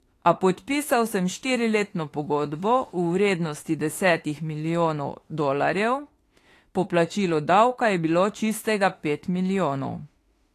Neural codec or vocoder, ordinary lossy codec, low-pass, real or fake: autoencoder, 48 kHz, 32 numbers a frame, DAC-VAE, trained on Japanese speech; AAC, 48 kbps; 14.4 kHz; fake